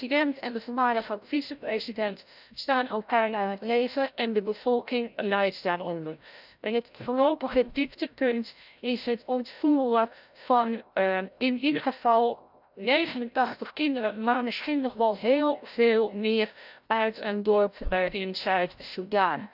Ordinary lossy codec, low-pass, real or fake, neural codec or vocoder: none; 5.4 kHz; fake; codec, 16 kHz, 0.5 kbps, FreqCodec, larger model